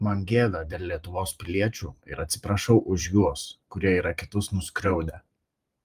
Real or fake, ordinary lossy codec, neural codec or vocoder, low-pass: fake; Opus, 32 kbps; vocoder, 44.1 kHz, 128 mel bands every 512 samples, BigVGAN v2; 14.4 kHz